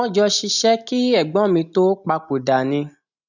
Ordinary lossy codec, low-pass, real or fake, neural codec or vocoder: none; 7.2 kHz; real; none